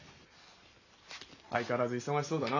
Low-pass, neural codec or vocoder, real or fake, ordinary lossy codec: 7.2 kHz; none; real; none